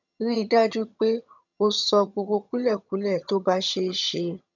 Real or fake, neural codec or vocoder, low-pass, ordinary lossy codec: fake; vocoder, 22.05 kHz, 80 mel bands, HiFi-GAN; 7.2 kHz; none